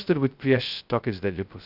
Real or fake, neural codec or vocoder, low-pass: fake; codec, 16 kHz, 0.2 kbps, FocalCodec; 5.4 kHz